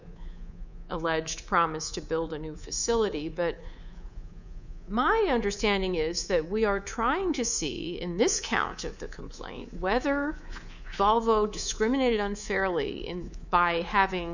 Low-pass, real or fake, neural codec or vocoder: 7.2 kHz; fake; codec, 24 kHz, 3.1 kbps, DualCodec